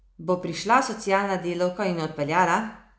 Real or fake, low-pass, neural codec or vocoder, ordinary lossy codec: real; none; none; none